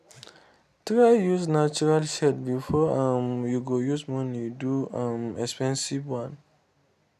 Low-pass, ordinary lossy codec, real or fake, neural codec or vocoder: 14.4 kHz; none; real; none